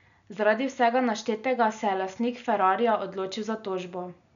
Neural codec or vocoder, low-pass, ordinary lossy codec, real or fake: none; 7.2 kHz; none; real